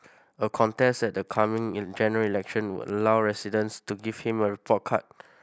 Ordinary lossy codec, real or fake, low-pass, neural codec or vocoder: none; real; none; none